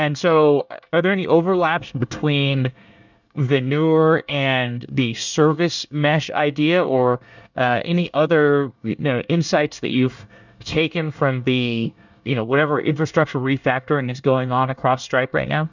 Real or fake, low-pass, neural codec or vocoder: fake; 7.2 kHz; codec, 24 kHz, 1 kbps, SNAC